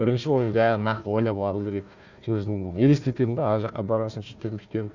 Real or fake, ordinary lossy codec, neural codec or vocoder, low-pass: fake; none; codec, 16 kHz, 1 kbps, FunCodec, trained on Chinese and English, 50 frames a second; 7.2 kHz